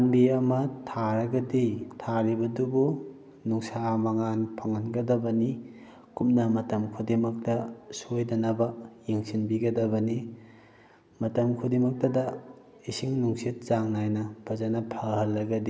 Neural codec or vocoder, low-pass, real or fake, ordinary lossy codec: none; none; real; none